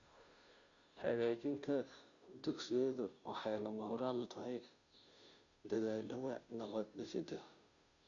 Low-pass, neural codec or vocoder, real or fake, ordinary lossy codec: 7.2 kHz; codec, 16 kHz, 0.5 kbps, FunCodec, trained on Chinese and English, 25 frames a second; fake; none